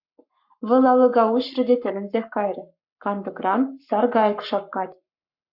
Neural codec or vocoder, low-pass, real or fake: codec, 44.1 kHz, 7.8 kbps, Pupu-Codec; 5.4 kHz; fake